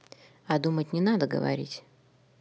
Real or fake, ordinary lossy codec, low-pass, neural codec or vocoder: real; none; none; none